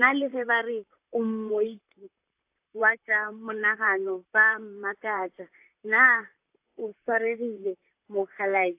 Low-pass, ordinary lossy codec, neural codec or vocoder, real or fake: 3.6 kHz; AAC, 32 kbps; vocoder, 44.1 kHz, 128 mel bands, Pupu-Vocoder; fake